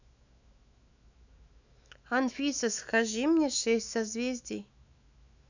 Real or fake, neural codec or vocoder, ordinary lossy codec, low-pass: fake; autoencoder, 48 kHz, 128 numbers a frame, DAC-VAE, trained on Japanese speech; none; 7.2 kHz